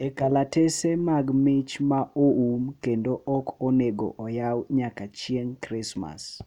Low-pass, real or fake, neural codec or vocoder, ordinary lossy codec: 19.8 kHz; real; none; none